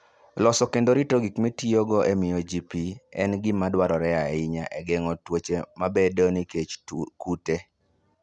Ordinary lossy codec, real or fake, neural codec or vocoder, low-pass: none; real; none; none